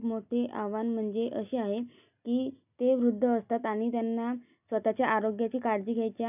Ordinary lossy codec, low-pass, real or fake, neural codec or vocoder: none; 3.6 kHz; real; none